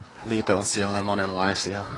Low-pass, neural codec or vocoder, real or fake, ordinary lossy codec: 10.8 kHz; codec, 24 kHz, 1 kbps, SNAC; fake; AAC, 32 kbps